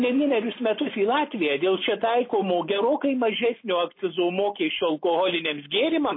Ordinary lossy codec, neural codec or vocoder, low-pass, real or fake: MP3, 24 kbps; none; 5.4 kHz; real